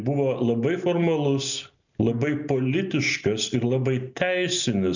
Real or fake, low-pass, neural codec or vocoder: real; 7.2 kHz; none